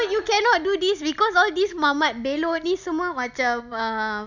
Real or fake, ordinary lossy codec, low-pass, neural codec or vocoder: real; none; 7.2 kHz; none